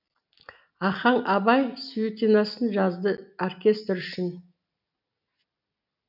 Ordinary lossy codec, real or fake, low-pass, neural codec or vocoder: none; real; 5.4 kHz; none